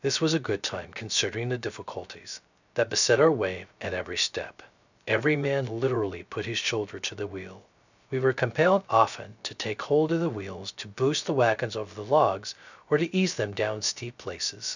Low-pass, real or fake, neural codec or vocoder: 7.2 kHz; fake; codec, 16 kHz, 0.3 kbps, FocalCodec